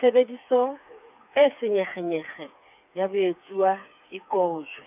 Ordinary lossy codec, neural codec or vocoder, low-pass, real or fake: none; codec, 16 kHz, 4 kbps, FreqCodec, smaller model; 3.6 kHz; fake